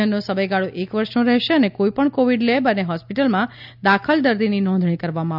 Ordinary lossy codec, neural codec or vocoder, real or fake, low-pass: none; none; real; 5.4 kHz